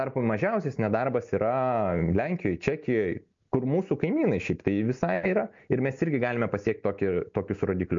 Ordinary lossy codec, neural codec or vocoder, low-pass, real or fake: MP3, 48 kbps; none; 7.2 kHz; real